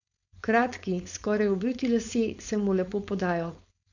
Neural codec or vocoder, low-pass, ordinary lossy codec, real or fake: codec, 16 kHz, 4.8 kbps, FACodec; 7.2 kHz; none; fake